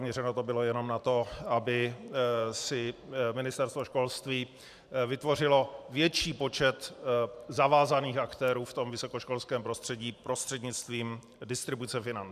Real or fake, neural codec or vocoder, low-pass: fake; vocoder, 44.1 kHz, 128 mel bands every 512 samples, BigVGAN v2; 14.4 kHz